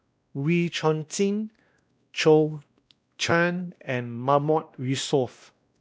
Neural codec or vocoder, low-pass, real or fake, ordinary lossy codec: codec, 16 kHz, 1 kbps, X-Codec, WavLM features, trained on Multilingual LibriSpeech; none; fake; none